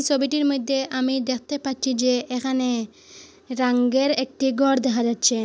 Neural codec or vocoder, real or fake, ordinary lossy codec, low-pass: none; real; none; none